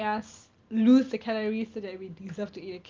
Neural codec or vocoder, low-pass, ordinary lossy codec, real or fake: none; 7.2 kHz; Opus, 32 kbps; real